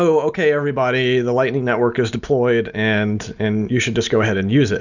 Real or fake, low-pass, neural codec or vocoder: real; 7.2 kHz; none